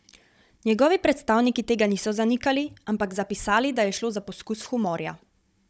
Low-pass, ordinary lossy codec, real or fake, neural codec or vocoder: none; none; fake; codec, 16 kHz, 16 kbps, FunCodec, trained on Chinese and English, 50 frames a second